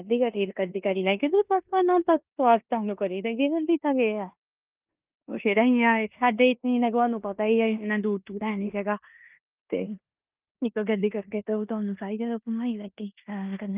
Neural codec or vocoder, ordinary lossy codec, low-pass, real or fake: codec, 16 kHz in and 24 kHz out, 0.9 kbps, LongCat-Audio-Codec, four codebook decoder; Opus, 32 kbps; 3.6 kHz; fake